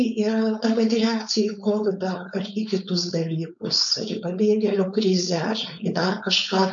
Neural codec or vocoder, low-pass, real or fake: codec, 16 kHz, 4.8 kbps, FACodec; 7.2 kHz; fake